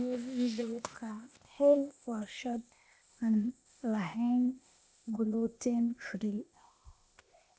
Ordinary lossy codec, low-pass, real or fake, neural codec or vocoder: none; none; fake; codec, 16 kHz, 0.8 kbps, ZipCodec